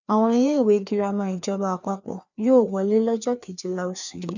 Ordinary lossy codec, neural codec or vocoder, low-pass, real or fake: none; codec, 16 kHz, 2 kbps, FreqCodec, larger model; 7.2 kHz; fake